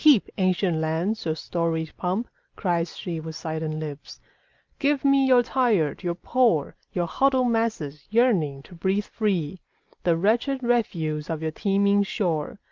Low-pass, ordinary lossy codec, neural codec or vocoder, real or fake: 7.2 kHz; Opus, 24 kbps; none; real